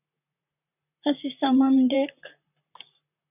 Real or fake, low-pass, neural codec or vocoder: fake; 3.6 kHz; vocoder, 44.1 kHz, 128 mel bands, Pupu-Vocoder